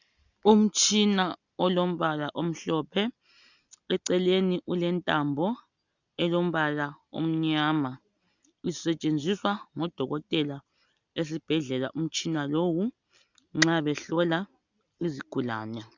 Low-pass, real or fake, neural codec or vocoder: 7.2 kHz; real; none